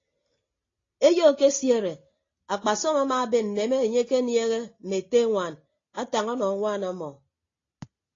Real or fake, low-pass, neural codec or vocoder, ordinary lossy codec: real; 7.2 kHz; none; AAC, 32 kbps